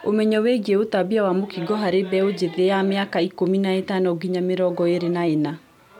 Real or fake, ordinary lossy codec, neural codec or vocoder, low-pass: real; none; none; 19.8 kHz